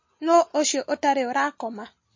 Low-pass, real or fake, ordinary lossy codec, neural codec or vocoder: 7.2 kHz; real; MP3, 32 kbps; none